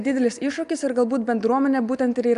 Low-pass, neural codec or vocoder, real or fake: 10.8 kHz; none; real